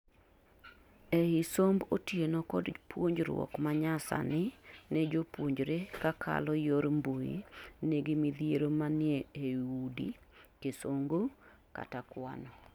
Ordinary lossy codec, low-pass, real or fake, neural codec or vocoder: none; 19.8 kHz; real; none